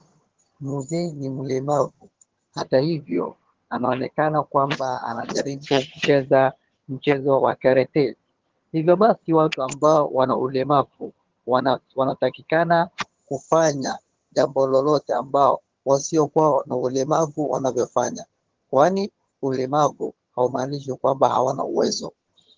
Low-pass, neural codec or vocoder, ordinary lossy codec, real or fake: 7.2 kHz; vocoder, 22.05 kHz, 80 mel bands, HiFi-GAN; Opus, 16 kbps; fake